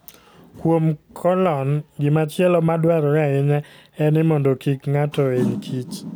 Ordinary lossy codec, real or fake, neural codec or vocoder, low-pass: none; real; none; none